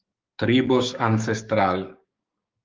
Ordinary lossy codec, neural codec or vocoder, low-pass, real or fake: Opus, 24 kbps; codec, 16 kHz, 6 kbps, DAC; 7.2 kHz; fake